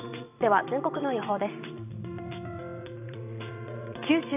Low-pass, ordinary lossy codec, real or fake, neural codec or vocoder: 3.6 kHz; none; fake; vocoder, 22.05 kHz, 80 mel bands, WaveNeXt